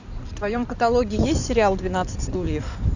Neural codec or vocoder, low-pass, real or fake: codec, 16 kHz in and 24 kHz out, 2.2 kbps, FireRedTTS-2 codec; 7.2 kHz; fake